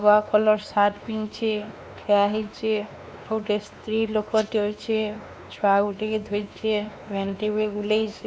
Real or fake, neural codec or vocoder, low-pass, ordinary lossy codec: fake; codec, 16 kHz, 2 kbps, X-Codec, WavLM features, trained on Multilingual LibriSpeech; none; none